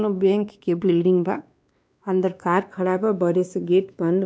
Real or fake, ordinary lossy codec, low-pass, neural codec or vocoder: fake; none; none; codec, 16 kHz, 2 kbps, X-Codec, WavLM features, trained on Multilingual LibriSpeech